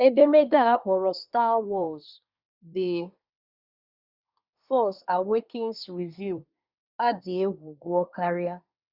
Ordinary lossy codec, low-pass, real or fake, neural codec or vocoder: Opus, 64 kbps; 5.4 kHz; fake; codec, 24 kHz, 1 kbps, SNAC